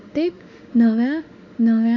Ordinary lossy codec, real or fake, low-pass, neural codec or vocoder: none; fake; 7.2 kHz; autoencoder, 48 kHz, 32 numbers a frame, DAC-VAE, trained on Japanese speech